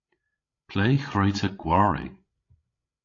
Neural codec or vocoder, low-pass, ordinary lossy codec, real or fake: codec, 16 kHz, 16 kbps, FreqCodec, larger model; 7.2 kHz; AAC, 32 kbps; fake